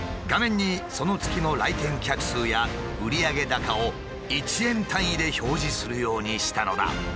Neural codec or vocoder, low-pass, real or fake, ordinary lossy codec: none; none; real; none